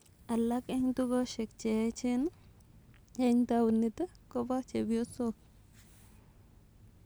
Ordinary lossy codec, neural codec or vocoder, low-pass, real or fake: none; none; none; real